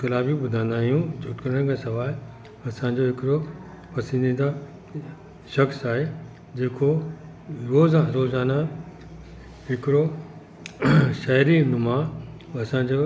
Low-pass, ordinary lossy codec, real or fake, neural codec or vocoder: none; none; real; none